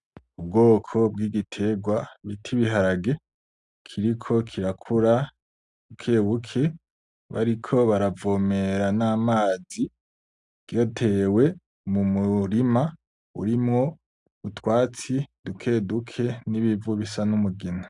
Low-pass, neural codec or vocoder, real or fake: 10.8 kHz; none; real